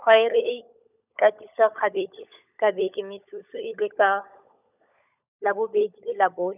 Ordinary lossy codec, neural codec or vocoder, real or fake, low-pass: none; codec, 16 kHz, 16 kbps, FunCodec, trained on LibriTTS, 50 frames a second; fake; 3.6 kHz